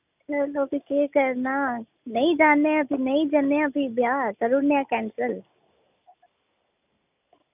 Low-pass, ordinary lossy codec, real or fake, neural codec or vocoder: 3.6 kHz; none; real; none